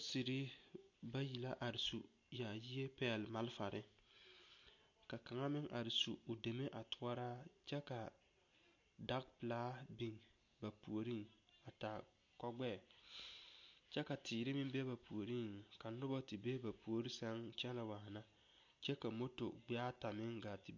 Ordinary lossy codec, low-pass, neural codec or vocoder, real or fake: MP3, 48 kbps; 7.2 kHz; none; real